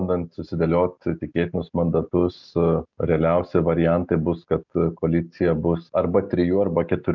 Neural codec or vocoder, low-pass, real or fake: none; 7.2 kHz; real